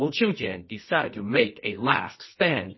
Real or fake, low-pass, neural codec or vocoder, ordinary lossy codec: fake; 7.2 kHz; codec, 24 kHz, 0.9 kbps, WavTokenizer, medium music audio release; MP3, 24 kbps